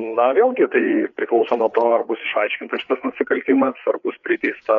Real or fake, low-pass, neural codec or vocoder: fake; 7.2 kHz; codec, 16 kHz, 4 kbps, FreqCodec, larger model